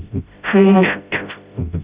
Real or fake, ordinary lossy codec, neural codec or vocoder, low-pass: fake; Opus, 64 kbps; codec, 16 kHz, 0.5 kbps, FreqCodec, smaller model; 3.6 kHz